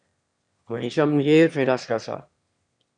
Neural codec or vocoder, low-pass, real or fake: autoencoder, 22.05 kHz, a latent of 192 numbers a frame, VITS, trained on one speaker; 9.9 kHz; fake